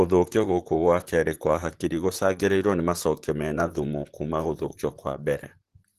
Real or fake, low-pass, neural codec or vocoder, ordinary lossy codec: fake; 14.4 kHz; vocoder, 44.1 kHz, 128 mel bands, Pupu-Vocoder; Opus, 16 kbps